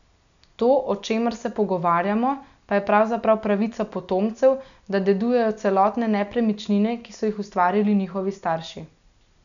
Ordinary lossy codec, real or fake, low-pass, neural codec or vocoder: none; real; 7.2 kHz; none